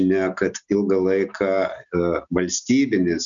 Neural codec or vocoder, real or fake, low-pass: none; real; 7.2 kHz